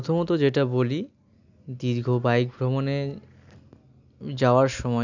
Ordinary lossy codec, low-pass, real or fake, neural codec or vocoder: none; 7.2 kHz; real; none